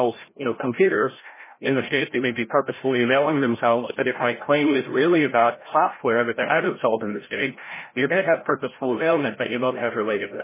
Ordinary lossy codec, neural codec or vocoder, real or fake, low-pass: MP3, 16 kbps; codec, 16 kHz, 0.5 kbps, FreqCodec, larger model; fake; 3.6 kHz